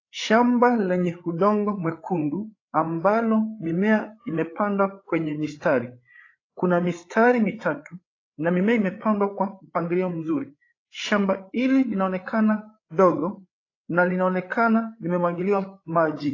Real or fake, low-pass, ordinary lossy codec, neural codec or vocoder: fake; 7.2 kHz; AAC, 32 kbps; codec, 16 kHz, 8 kbps, FreqCodec, larger model